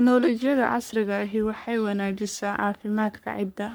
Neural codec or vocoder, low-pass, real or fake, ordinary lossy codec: codec, 44.1 kHz, 3.4 kbps, Pupu-Codec; none; fake; none